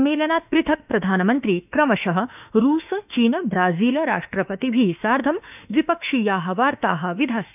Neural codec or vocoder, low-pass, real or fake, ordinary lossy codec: autoencoder, 48 kHz, 32 numbers a frame, DAC-VAE, trained on Japanese speech; 3.6 kHz; fake; none